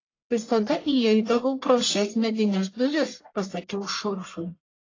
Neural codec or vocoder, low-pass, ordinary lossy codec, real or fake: codec, 44.1 kHz, 1.7 kbps, Pupu-Codec; 7.2 kHz; AAC, 32 kbps; fake